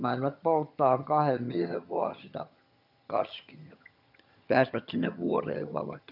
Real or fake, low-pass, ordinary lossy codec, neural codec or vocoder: fake; 5.4 kHz; none; vocoder, 22.05 kHz, 80 mel bands, HiFi-GAN